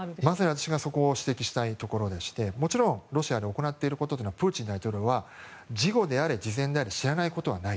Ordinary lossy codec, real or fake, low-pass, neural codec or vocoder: none; real; none; none